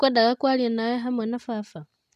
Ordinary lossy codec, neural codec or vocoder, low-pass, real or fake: none; none; 14.4 kHz; real